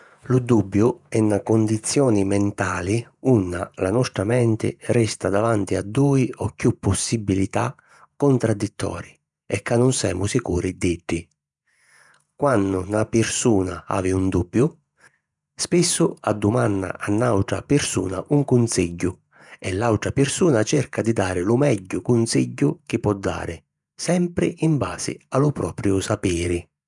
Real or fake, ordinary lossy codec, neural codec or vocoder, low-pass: fake; none; vocoder, 48 kHz, 128 mel bands, Vocos; 10.8 kHz